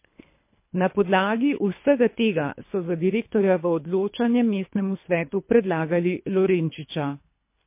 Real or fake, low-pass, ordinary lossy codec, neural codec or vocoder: fake; 3.6 kHz; MP3, 24 kbps; codec, 24 kHz, 3 kbps, HILCodec